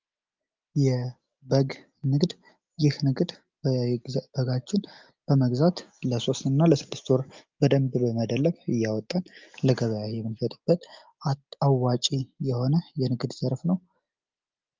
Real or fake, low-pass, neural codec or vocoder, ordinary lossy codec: real; 7.2 kHz; none; Opus, 32 kbps